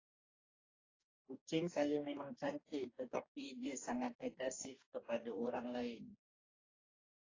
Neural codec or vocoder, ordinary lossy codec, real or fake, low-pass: codec, 44.1 kHz, 2.6 kbps, DAC; AAC, 32 kbps; fake; 7.2 kHz